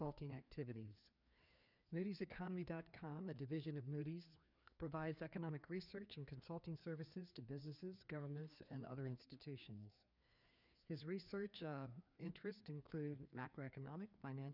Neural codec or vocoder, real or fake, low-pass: codec, 16 kHz, 2 kbps, FreqCodec, larger model; fake; 5.4 kHz